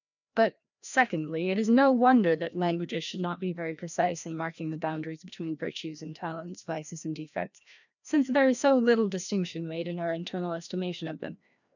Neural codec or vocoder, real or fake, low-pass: codec, 16 kHz, 1 kbps, FreqCodec, larger model; fake; 7.2 kHz